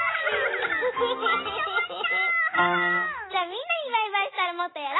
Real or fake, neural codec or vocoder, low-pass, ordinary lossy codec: real; none; 7.2 kHz; AAC, 16 kbps